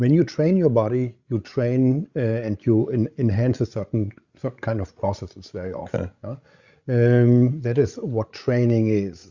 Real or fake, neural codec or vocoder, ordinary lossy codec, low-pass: fake; codec, 16 kHz, 16 kbps, FreqCodec, larger model; Opus, 64 kbps; 7.2 kHz